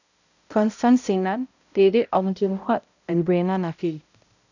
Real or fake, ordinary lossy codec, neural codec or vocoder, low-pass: fake; none; codec, 16 kHz, 0.5 kbps, X-Codec, HuBERT features, trained on balanced general audio; 7.2 kHz